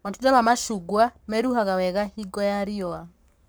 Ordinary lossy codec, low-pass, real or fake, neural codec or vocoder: none; none; fake; codec, 44.1 kHz, 7.8 kbps, Pupu-Codec